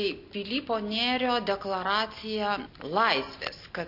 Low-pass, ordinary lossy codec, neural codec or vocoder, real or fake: 5.4 kHz; AAC, 48 kbps; none; real